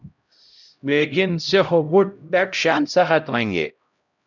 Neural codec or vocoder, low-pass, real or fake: codec, 16 kHz, 0.5 kbps, X-Codec, HuBERT features, trained on LibriSpeech; 7.2 kHz; fake